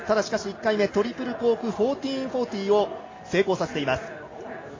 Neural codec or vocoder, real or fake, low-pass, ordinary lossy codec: none; real; 7.2 kHz; AAC, 32 kbps